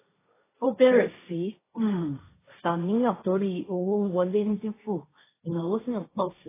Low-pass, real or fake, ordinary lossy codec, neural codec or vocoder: 3.6 kHz; fake; AAC, 16 kbps; codec, 16 kHz, 1.1 kbps, Voila-Tokenizer